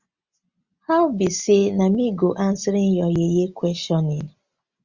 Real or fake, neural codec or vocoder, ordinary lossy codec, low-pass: real; none; Opus, 64 kbps; 7.2 kHz